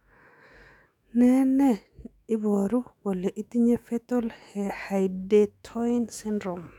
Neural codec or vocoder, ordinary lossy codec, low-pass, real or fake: autoencoder, 48 kHz, 128 numbers a frame, DAC-VAE, trained on Japanese speech; none; 19.8 kHz; fake